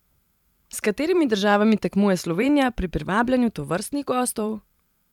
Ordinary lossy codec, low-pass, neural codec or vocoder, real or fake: none; 19.8 kHz; vocoder, 44.1 kHz, 128 mel bands every 512 samples, BigVGAN v2; fake